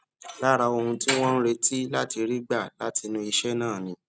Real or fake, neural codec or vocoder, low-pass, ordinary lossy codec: real; none; none; none